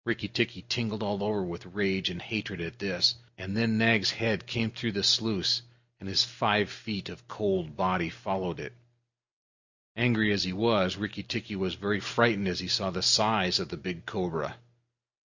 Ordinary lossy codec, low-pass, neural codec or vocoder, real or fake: Opus, 64 kbps; 7.2 kHz; none; real